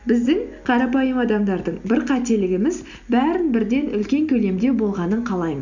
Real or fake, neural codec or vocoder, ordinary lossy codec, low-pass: real; none; none; 7.2 kHz